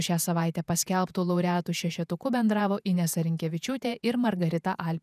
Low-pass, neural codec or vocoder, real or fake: 14.4 kHz; vocoder, 48 kHz, 128 mel bands, Vocos; fake